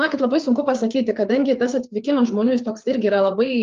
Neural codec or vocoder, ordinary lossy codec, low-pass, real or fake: codec, 16 kHz, 4 kbps, FreqCodec, larger model; Opus, 24 kbps; 7.2 kHz; fake